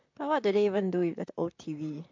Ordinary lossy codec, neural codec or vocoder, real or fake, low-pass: MP3, 64 kbps; vocoder, 44.1 kHz, 128 mel bands, Pupu-Vocoder; fake; 7.2 kHz